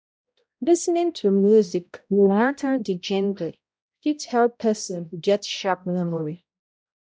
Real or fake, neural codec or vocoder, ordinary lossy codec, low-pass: fake; codec, 16 kHz, 0.5 kbps, X-Codec, HuBERT features, trained on balanced general audio; none; none